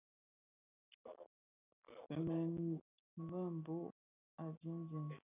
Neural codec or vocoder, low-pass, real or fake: none; 3.6 kHz; real